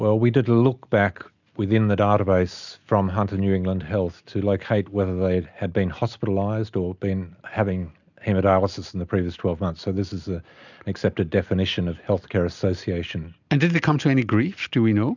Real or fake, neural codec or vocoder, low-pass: real; none; 7.2 kHz